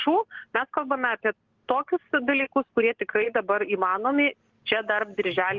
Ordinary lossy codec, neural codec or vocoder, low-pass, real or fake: Opus, 16 kbps; none; 7.2 kHz; real